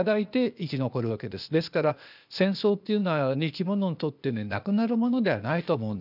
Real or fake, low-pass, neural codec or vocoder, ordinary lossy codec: fake; 5.4 kHz; codec, 16 kHz, 0.8 kbps, ZipCodec; none